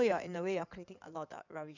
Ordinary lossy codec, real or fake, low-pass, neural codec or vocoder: none; fake; 7.2 kHz; codec, 16 kHz in and 24 kHz out, 2.2 kbps, FireRedTTS-2 codec